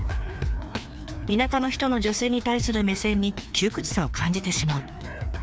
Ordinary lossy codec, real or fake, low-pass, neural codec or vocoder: none; fake; none; codec, 16 kHz, 2 kbps, FreqCodec, larger model